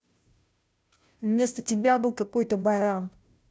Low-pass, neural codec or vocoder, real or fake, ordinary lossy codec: none; codec, 16 kHz, 0.5 kbps, FunCodec, trained on Chinese and English, 25 frames a second; fake; none